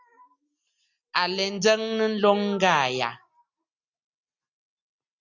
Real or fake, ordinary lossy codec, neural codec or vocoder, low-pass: real; Opus, 64 kbps; none; 7.2 kHz